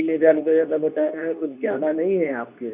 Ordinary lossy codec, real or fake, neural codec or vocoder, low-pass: none; fake; codec, 24 kHz, 0.9 kbps, WavTokenizer, medium speech release version 2; 3.6 kHz